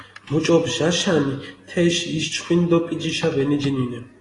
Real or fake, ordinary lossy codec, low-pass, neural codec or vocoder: real; AAC, 32 kbps; 10.8 kHz; none